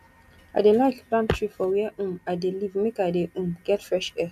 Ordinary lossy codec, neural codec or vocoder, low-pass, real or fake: none; none; 14.4 kHz; real